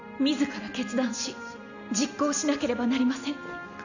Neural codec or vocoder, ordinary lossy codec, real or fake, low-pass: none; none; real; 7.2 kHz